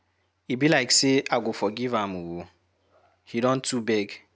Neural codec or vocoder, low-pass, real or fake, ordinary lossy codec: none; none; real; none